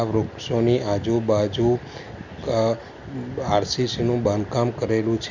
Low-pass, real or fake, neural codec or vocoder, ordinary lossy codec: 7.2 kHz; real; none; none